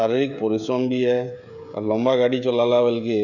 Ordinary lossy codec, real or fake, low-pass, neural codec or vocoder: none; fake; 7.2 kHz; codec, 16 kHz, 16 kbps, FreqCodec, smaller model